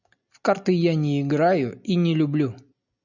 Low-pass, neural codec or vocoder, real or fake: 7.2 kHz; none; real